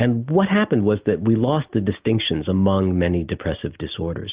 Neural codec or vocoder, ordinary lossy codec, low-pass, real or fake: none; Opus, 32 kbps; 3.6 kHz; real